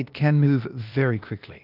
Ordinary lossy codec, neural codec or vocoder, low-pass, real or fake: Opus, 24 kbps; codec, 16 kHz, 0.8 kbps, ZipCodec; 5.4 kHz; fake